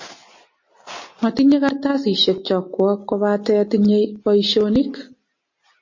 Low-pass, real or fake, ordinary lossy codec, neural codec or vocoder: 7.2 kHz; real; MP3, 32 kbps; none